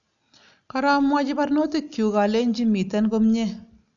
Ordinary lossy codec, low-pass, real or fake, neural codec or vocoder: none; 7.2 kHz; real; none